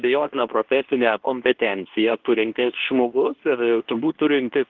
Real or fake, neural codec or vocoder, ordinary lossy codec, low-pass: fake; codec, 24 kHz, 0.9 kbps, WavTokenizer, medium speech release version 2; Opus, 16 kbps; 7.2 kHz